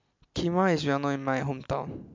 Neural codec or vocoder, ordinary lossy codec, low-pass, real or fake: none; MP3, 64 kbps; 7.2 kHz; real